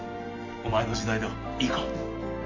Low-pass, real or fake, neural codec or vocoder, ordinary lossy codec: 7.2 kHz; real; none; MP3, 48 kbps